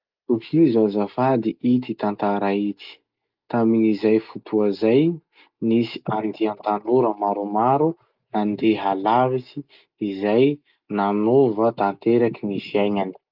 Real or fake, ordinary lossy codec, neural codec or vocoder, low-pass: real; Opus, 24 kbps; none; 5.4 kHz